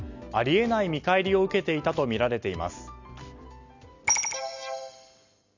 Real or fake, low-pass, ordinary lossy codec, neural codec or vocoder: real; 7.2 kHz; Opus, 64 kbps; none